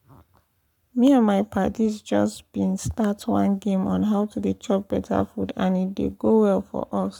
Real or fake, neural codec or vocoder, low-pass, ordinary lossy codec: fake; codec, 44.1 kHz, 7.8 kbps, Pupu-Codec; 19.8 kHz; none